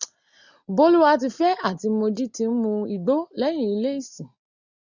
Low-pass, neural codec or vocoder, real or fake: 7.2 kHz; none; real